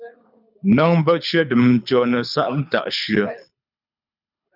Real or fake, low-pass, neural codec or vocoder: fake; 5.4 kHz; codec, 24 kHz, 6 kbps, HILCodec